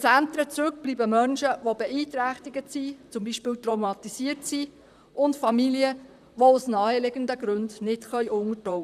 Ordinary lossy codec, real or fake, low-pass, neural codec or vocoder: none; fake; 14.4 kHz; vocoder, 44.1 kHz, 128 mel bands, Pupu-Vocoder